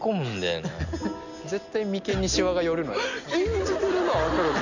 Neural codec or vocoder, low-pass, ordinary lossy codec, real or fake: none; 7.2 kHz; none; real